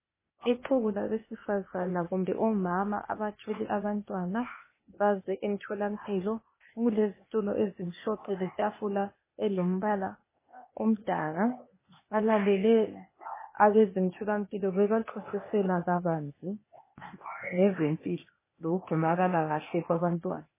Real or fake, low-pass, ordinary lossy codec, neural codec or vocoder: fake; 3.6 kHz; MP3, 16 kbps; codec, 16 kHz, 0.8 kbps, ZipCodec